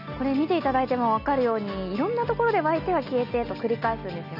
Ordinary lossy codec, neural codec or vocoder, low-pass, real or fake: none; none; 5.4 kHz; real